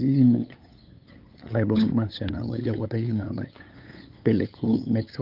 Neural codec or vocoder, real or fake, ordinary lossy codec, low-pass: codec, 16 kHz, 8 kbps, FunCodec, trained on LibriTTS, 25 frames a second; fake; Opus, 24 kbps; 5.4 kHz